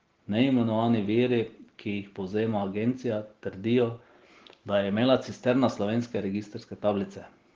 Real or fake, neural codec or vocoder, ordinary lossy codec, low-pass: real; none; Opus, 16 kbps; 7.2 kHz